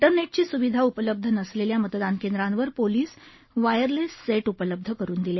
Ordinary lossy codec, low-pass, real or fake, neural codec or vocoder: MP3, 24 kbps; 7.2 kHz; real; none